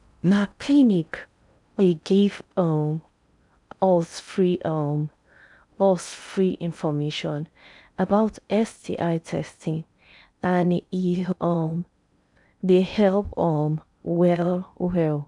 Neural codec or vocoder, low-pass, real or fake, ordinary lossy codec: codec, 16 kHz in and 24 kHz out, 0.6 kbps, FocalCodec, streaming, 4096 codes; 10.8 kHz; fake; none